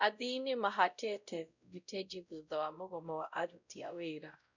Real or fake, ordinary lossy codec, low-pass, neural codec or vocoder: fake; none; 7.2 kHz; codec, 16 kHz, 0.5 kbps, X-Codec, WavLM features, trained on Multilingual LibriSpeech